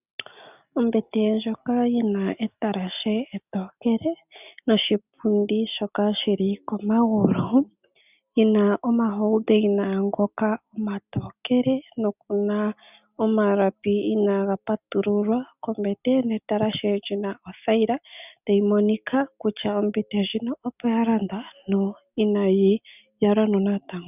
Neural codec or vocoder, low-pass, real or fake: none; 3.6 kHz; real